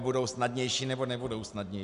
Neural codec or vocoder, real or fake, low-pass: none; real; 10.8 kHz